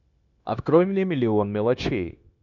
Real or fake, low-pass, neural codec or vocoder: fake; 7.2 kHz; codec, 16 kHz, 0.9 kbps, LongCat-Audio-Codec